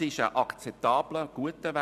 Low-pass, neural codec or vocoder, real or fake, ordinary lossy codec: 14.4 kHz; none; real; none